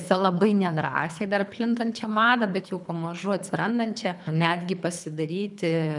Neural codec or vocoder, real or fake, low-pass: codec, 24 kHz, 3 kbps, HILCodec; fake; 10.8 kHz